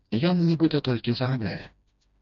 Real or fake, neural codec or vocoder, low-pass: fake; codec, 16 kHz, 1 kbps, FreqCodec, smaller model; 7.2 kHz